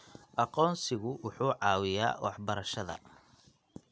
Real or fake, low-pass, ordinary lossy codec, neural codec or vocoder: real; none; none; none